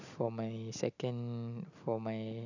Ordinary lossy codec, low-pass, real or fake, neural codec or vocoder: none; 7.2 kHz; real; none